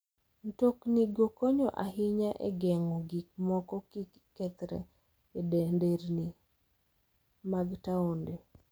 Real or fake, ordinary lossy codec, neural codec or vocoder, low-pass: real; none; none; none